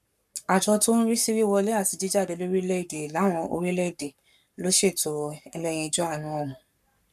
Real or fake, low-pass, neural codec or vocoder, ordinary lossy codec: fake; 14.4 kHz; codec, 44.1 kHz, 7.8 kbps, Pupu-Codec; none